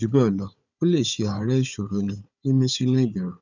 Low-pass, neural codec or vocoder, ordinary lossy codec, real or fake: 7.2 kHz; codec, 16 kHz, 4 kbps, FunCodec, trained on Chinese and English, 50 frames a second; none; fake